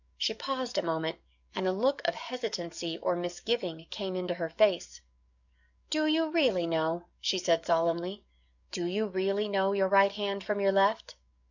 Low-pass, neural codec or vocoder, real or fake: 7.2 kHz; codec, 44.1 kHz, 7.8 kbps, DAC; fake